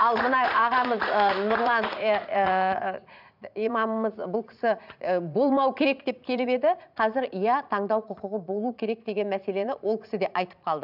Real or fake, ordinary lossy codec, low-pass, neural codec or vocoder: fake; none; 5.4 kHz; vocoder, 44.1 kHz, 80 mel bands, Vocos